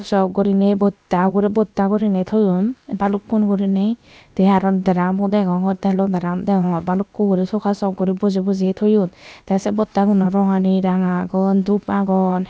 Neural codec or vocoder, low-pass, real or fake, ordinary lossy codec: codec, 16 kHz, about 1 kbps, DyCAST, with the encoder's durations; none; fake; none